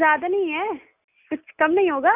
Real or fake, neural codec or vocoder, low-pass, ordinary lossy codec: real; none; 3.6 kHz; none